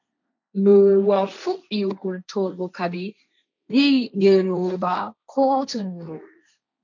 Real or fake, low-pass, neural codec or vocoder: fake; 7.2 kHz; codec, 16 kHz, 1.1 kbps, Voila-Tokenizer